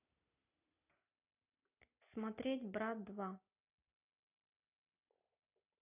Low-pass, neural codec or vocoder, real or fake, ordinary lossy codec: 3.6 kHz; none; real; AAC, 32 kbps